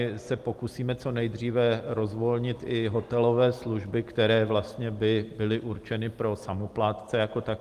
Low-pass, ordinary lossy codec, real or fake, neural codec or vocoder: 14.4 kHz; Opus, 24 kbps; real; none